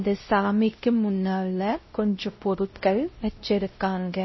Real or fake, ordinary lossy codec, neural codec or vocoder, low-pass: fake; MP3, 24 kbps; codec, 16 kHz, 0.5 kbps, X-Codec, WavLM features, trained on Multilingual LibriSpeech; 7.2 kHz